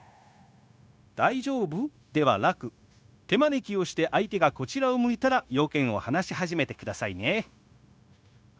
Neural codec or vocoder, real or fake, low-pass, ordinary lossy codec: codec, 16 kHz, 0.9 kbps, LongCat-Audio-Codec; fake; none; none